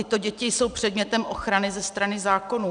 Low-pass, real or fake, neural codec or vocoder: 9.9 kHz; real; none